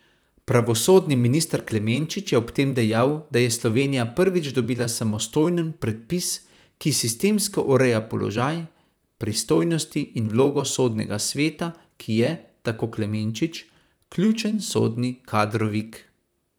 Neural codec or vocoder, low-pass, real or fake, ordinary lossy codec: vocoder, 44.1 kHz, 128 mel bands, Pupu-Vocoder; none; fake; none